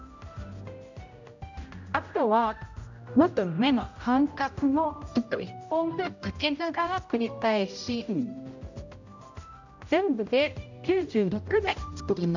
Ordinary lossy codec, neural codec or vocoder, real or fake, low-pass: none; codec, 16 kHz, 0.5 kbps, X-Codec, HuBERT features, trained on general audio; fake; 7.2 kHz